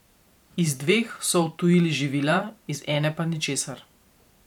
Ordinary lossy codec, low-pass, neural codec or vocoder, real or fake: none; 19.8 kHz; vocoder, 44.1 kHz, 128 mel bands every 512 samples, BigVGAN v2; fake